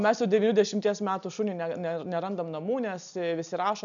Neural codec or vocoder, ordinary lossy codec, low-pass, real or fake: none; MP3, 96 kbps; 7.2 kHz; real